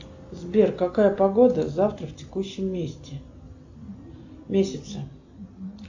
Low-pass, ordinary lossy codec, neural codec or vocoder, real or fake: 7.2 kHz; AAC, 48 kbps; none; real